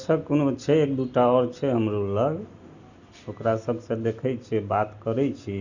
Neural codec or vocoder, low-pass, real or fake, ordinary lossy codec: none; 7.2 kHz; real; Opus, 64 kbps